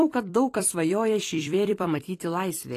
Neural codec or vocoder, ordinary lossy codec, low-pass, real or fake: vocoder, 44.1 kHz, 128 mel bands every 256 samples, BigVGAN v2; AAC, 48 kbps; 14.4 kHz; fake